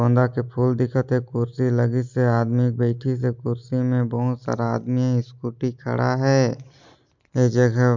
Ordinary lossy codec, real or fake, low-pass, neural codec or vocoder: MP3, 64 kbps; real; 7.2 kHz; none